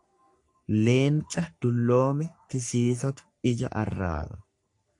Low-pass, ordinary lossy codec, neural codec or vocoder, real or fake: 10.8 kHz; AAC, 64 kbps; codec, 44.1 kHz, 3.4 kbps, Pupu-Codec; fake